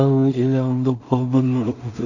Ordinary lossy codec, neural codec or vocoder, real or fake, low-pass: none; codec, 16 kHz in and 24 kHz out, 0.4 kbps, LongCat-Audio-Codec, two codebook decoder; fake; 7.2 kHz